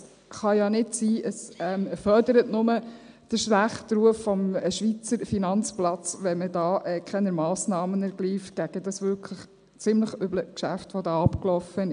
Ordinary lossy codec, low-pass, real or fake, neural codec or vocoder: none; 9.9 kHz; real; none